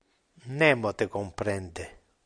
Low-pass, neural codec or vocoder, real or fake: 9.9 kHz; none; real